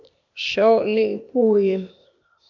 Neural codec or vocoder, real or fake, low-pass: codec, 16 kHz, 0.8 kbps, ZipCodec; fake; 7.2 kHz